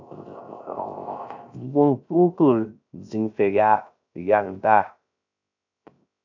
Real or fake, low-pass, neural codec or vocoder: fake; 7.2 kHz; codec, 16 kHz, 0.3 kbps, FocalCodec